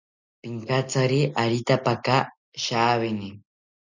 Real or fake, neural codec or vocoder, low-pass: real; none; 7.2 kHz